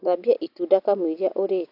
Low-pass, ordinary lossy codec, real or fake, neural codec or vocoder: 5.4 kHz; AAC, 32 kbps; real; none